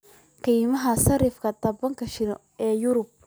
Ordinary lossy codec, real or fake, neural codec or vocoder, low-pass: none; real; none; none